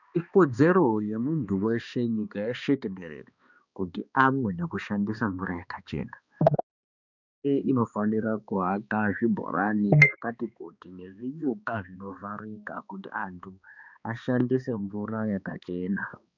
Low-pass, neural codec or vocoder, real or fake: 7.2 kHz; codec, 16 kHz, 2 kbps, X-Codec, HuBERT features, trained on balanced general audio; fake